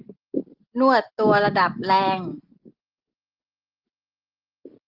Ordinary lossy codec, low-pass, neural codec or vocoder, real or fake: Opus, 16 kbps; 5.4 kHz; none; real